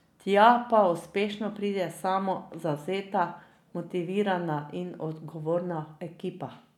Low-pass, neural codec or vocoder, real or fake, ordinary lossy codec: 19.8 kHz; none; real; none